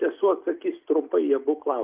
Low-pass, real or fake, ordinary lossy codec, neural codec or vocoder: 3.6 kHz; real; Opus, 32 kbps; none